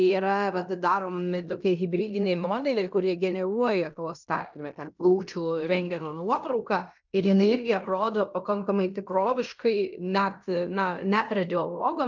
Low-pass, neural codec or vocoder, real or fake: 7.2 kHz; codec, 16 kHz in and 24 kHz out, 0.9 kbps, LongCat-Audio-Codec, fine tuned four codebook decoder; fake